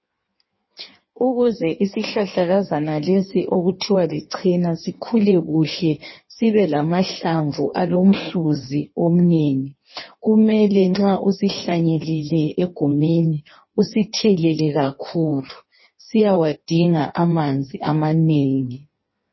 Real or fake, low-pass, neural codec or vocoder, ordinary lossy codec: fake; 7.2 kHz; codec, 16 kHz in and 24 kHz out, 1.1 kbps, FireRedTTS-2 codec; MP3, 24 kbps